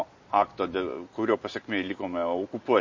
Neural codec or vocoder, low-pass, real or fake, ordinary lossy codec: none; 7.2 kHz; real; MP3, 32 kbps